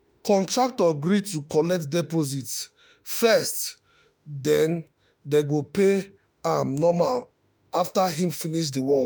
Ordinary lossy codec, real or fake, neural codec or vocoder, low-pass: none; fake; autoencoder, 48 kHz, 32 numbers a frame, DAC-VAE, trained on Japanese speech; none